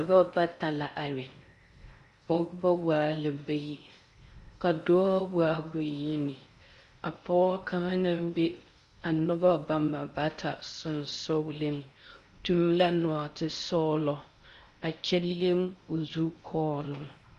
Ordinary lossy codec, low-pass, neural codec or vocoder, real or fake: Opus, 64 kbps; 10.8 kHz; codec, 16 kHz in and 24 kHz out, 0.6 kbps, FocalCodec, streaming, 4096 codes; fake